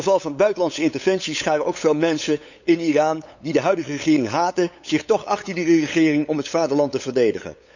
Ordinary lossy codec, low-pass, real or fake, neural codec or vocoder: none; 7.2 kHz; fake; codec, 16 kHz, 8 kbps, FunCodec, trained on LibriTTS, 25 frames a second